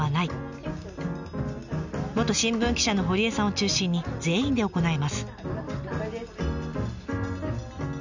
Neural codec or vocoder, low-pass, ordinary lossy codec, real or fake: none; 7.2 kHz; none; real